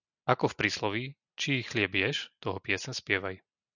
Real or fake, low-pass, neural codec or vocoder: real; 7.2 kHz; none